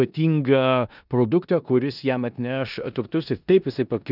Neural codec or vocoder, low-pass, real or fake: codec, 16 kHz in and 24 kHz out, 0.9 kbps, LongCat-Audio-Codec, four codebook decoder; 5.4 kHz; fake